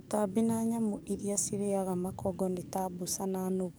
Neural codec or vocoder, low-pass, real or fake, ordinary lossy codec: codec, 44.1 kHz, 7.8 kbps, DAC; none; fake; none